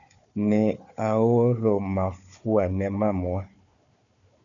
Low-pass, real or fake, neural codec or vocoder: 7.2 kHz; fake; codec, 16 kHz, 4 kbps, FunCodec, trained on Chinese and English, 50 frames a second